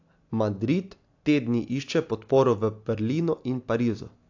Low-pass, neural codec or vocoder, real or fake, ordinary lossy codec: 7.2 kHz; none; real; AAC, 48 kbps